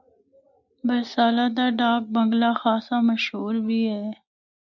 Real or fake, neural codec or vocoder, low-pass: real; none; 7.2 kHz